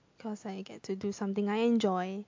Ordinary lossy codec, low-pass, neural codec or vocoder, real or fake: MP3, 48 kbps; 7.2 kHz; none; real